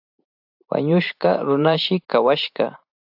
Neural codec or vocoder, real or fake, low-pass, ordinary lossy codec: vocoder, 44.1 kHz, 128 mel bands every 512 samples, BigVGAN v2; fake; 5.4 kHz; AAC, 48 kbps